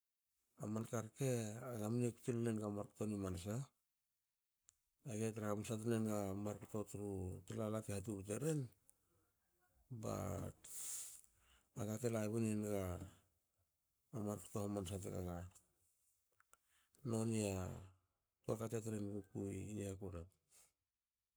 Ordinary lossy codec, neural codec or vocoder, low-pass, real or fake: none; codec, 44.1 kHz, 7.8 kbps, Pupu-Codec; none; fake